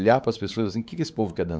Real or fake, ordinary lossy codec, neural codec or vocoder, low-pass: fake; none; codec, 16 kHz, 4 kbps, X-Codec, WavLM features, trained on Multilingual LibriSpeech; none